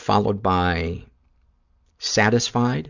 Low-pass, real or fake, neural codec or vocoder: 7.2 kHz; real; none